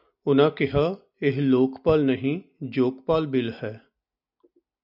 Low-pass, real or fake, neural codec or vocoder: 5.4 kHz; real; none